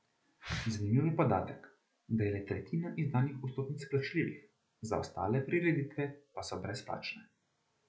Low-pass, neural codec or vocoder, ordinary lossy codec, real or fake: none; none; none; real